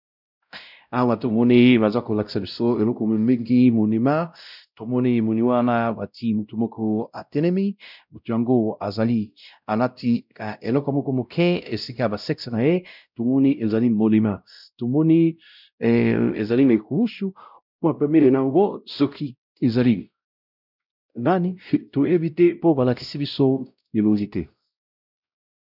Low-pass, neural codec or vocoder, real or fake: 5.4 kHz; codec, 16 kHz, 0.5 kbps, X-Codec, WavLM features, trained on Multilingual LibriSpeech; fake